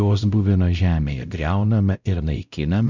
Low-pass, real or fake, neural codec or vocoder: 7.2 kHz; fake; codec, 16 kHz, 0.5 kbps, X-Codec, WavLM features, trained on Multilingual LibriSpeech